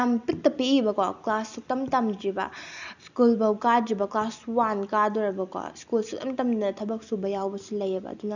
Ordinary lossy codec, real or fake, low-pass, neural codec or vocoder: none; real; 7.2 kHz; none